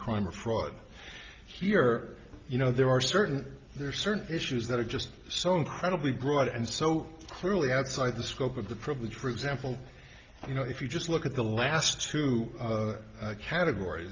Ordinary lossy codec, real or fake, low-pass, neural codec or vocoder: Opus, 32 kbps; real; 7.2 kHz; none